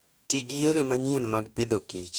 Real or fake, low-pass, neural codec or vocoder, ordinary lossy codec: fake; none; codec, 44.1 kHz, 2.6 kbps, DAC; none